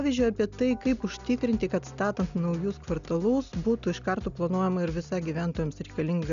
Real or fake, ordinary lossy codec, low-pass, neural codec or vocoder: real; MP3, 96 kbps; 7.2 kHz; none